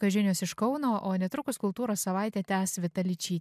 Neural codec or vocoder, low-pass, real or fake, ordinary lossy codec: none; 14.4 kHz; real; MP3, 96 kbps